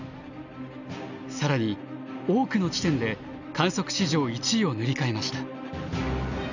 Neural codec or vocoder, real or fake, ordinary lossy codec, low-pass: none; real; none; 7.2 kHz